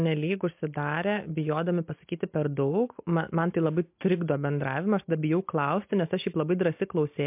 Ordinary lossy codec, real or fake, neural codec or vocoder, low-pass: MP3, 32 kbps; real; none; 3.6 kHz